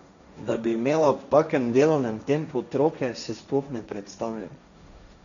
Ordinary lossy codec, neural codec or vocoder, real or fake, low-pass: none; codec, 16 kHz, 1.1 kbps, Voila-Tokenizer; fake; 7.2 kHz